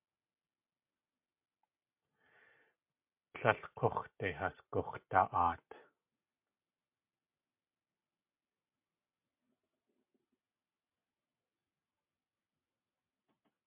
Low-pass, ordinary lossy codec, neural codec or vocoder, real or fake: 3.6 kHz; MP3, 32 kbps; none; real